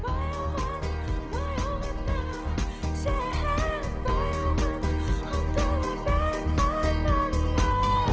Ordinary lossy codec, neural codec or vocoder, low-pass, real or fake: Opus, 16 kbps; none; 7.2 kHz; real